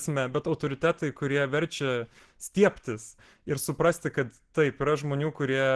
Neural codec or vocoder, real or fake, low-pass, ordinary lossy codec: none; real; 10.8 kHz; Opus, 16 kbps